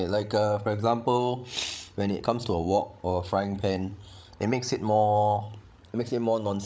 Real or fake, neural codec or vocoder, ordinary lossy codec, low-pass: fake; codec, 16 kHz, 16 kbps, FreqCodec, larger model; none; none